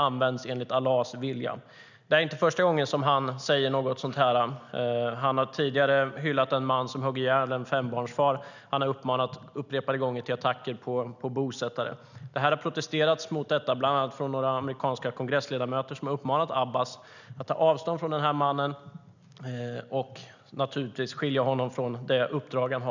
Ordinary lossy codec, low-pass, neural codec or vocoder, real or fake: none; 7.2 kHz; none; real